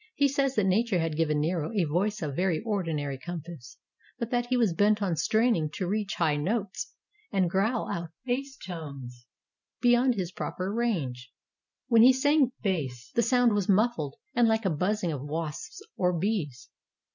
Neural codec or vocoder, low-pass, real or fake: none; 7.2 kHz; real